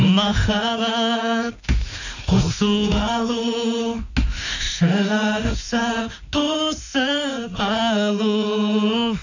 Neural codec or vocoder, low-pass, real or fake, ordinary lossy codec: autoencoder, 48 kHz, 32 numbers a frame, DAC-VAE, trained on Japanese speech; 7.2 kHz; fake; none